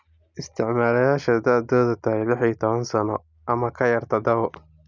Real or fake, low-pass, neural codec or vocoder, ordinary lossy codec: real; 7.2 kHz; none; none